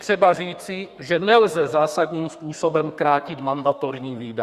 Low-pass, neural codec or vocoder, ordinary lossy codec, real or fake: 14.4 kHz; codec, 32 kHz, 1.9 kbps, SNAC; Opus, 64 kbps; fake